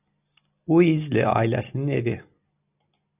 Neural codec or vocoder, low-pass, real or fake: none; 3.6 kHz; real